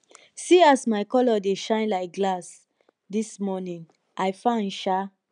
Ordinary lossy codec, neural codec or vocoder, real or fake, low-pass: none; none; real; 9.9 kHz